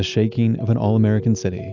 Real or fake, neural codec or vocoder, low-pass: real; none; 7.2 kHz